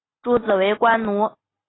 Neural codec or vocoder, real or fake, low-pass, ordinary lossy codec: none; real; 7.2 kHz; AAC, 16 kbps